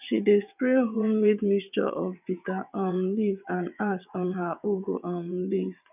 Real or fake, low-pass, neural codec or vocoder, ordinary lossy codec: fake; 3.6 kHz; vocoder, 22.05 kHz, 80 mel bands, WaveNeXt; none